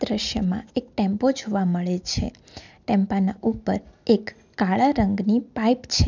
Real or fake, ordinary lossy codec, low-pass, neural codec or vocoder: real; none; 7.2 kHz; none